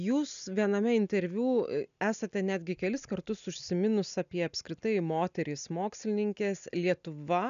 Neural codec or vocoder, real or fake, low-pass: none; real; 7.2 kHz